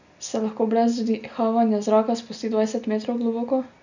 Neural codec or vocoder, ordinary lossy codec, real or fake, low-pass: none; none; real; 7.2 kHz